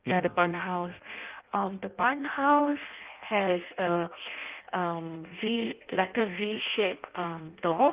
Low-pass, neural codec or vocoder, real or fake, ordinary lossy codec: 3.6 kHz; codec, 16 kHz in and 24 kHz out, 0.6 kbps, FireRedTTS-2 codec; fake; Opus, 24 kbps